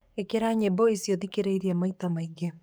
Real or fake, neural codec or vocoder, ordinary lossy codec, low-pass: fake; codec, 44.1 kHz, 7.8 kbps, DAC; none; none